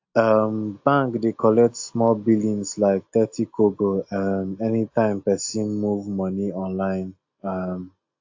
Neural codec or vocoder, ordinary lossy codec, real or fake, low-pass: none; AAC, 48 kbps; real; 7.2 kHz